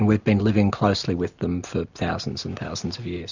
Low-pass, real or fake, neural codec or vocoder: 7.2 kHz; real; none